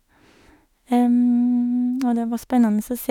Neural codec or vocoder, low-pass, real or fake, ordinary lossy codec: autoencoder, 48 kHz, 32 numbers a frame, DAC-VAE, trained on Japanese speech; 19.8 kHz; fake; none